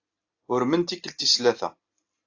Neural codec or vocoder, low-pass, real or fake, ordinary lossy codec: none; 7.2 kHz; real; AAC, 48 kbps